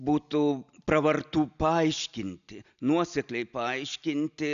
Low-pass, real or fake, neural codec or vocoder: 7.2 kHz; real; none